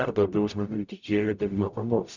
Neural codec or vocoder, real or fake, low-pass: codec, 44.1 kHz, 0.9 kbps, DAC; fake; 7.2 kHz